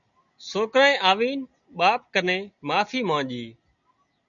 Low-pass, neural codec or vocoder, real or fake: 7.2 kHz; none; real